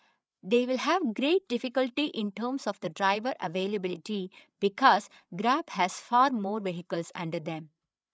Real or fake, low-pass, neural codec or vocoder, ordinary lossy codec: fake; none; codec, 16 kHz, 8 kbps, FreqCodec, larger model; none